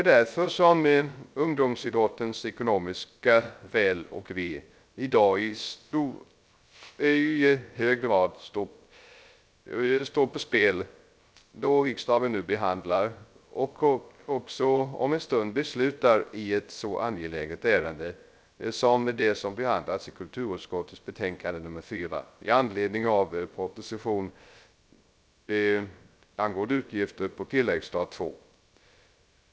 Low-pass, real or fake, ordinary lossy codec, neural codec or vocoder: none; fake; none; codec, 16 kHz, 0.3 kbps, FocalCodec